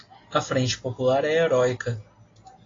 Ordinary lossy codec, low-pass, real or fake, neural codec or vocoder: AAC, 32 kbps; 7.2 kHz; real; none